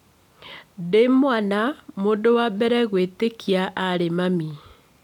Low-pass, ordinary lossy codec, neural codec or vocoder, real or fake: 19.8 kHz; none; none; real